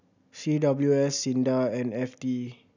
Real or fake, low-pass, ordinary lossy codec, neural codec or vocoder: real; 7.2 kHz; none; none